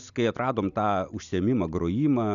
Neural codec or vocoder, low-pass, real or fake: none; 7.2 kHz; real